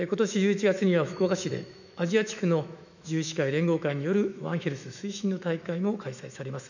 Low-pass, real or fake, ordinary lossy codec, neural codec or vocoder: 7.2 kHz; fake; none; autoencoder, 48 kHz, 128 numbers a frame, DAC-VAE, trained on Japanese speech